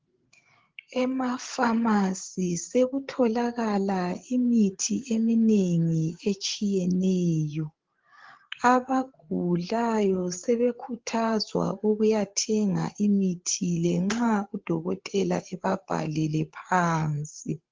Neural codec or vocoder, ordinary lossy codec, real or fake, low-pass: codec, 16 kHz, 8 kbps, FreqCodec, larger model; Opus, 16 kbps; fake; 7.2 kHz